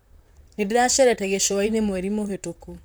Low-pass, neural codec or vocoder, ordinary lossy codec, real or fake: none; vocoder, 44.1 kHz, 128 mel bands, Pupu-Vocoder; none; fake